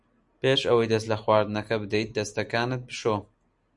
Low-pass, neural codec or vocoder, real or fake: 10.8 kHz; none; real